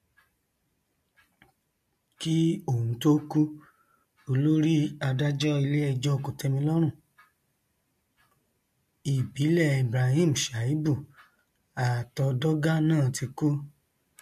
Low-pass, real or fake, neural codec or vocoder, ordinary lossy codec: 14.4 kHz; real; none; MP3, 64 kbps